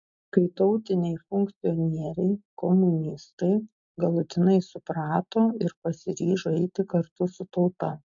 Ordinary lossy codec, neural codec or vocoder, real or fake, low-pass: MP3, 48 kbps; none; real; 7.2 kHz